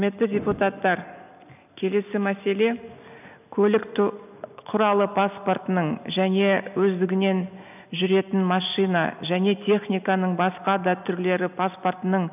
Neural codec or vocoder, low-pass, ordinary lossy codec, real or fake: none; 3.6 kHz; none; real